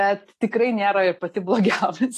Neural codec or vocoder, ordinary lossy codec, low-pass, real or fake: none; AAC, 48 kbps; 14.4 kHz; real